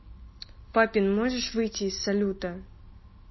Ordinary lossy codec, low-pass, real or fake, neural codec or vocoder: MP3, 24 kbps; 7.2 kHz; real; none